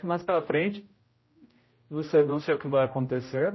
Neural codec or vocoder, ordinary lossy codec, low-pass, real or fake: codec, 16 kHz, 0.5 kbps, X-Codec, HuBERT features, trained on general audio; MP3, 24 kbps; 7.2 kHz; fake